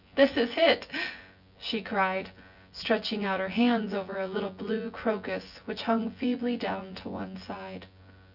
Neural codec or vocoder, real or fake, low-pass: vocoder, 24 kHz, 100 mel bands, Vocos; fake; 5.4 kHz